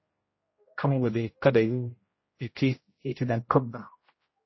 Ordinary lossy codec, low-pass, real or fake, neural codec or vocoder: MP3, 24 kbps; 7.2 kHz; fake; codec, 16 kHz, 0.5 kbps, X-Codec, HuBERT features, trained on general audio